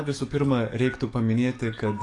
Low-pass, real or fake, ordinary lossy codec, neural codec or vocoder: 10.8 kHz; fake; AAC, 48 kbps; codec, 44.1 kHz, 7.8 kbps, DAC